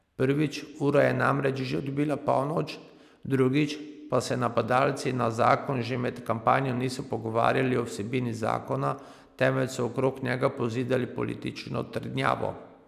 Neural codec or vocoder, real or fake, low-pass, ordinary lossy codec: none; real; 14.4 kHz; none